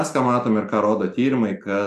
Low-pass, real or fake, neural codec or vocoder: 14.4 kHz; real; none